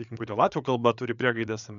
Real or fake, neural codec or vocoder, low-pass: fake; codec, 16 kHz, 8 kbps, FreqCodec, larger model; 7.2 kHz